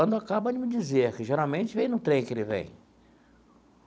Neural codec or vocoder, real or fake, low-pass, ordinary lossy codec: none; real; none; none